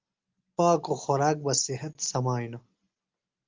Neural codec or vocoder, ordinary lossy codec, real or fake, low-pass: none; Opus, 32 kbps; real; 7.2 kHz